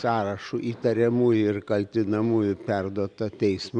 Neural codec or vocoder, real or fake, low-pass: none; real; 9.9 kHz